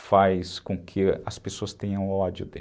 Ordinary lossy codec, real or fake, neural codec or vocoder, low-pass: none; real; none; none